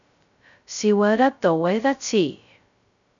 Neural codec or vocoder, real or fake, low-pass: codec, 16 kHz, 0.2 kbps, FocalCodec; fake; 7.2 kHz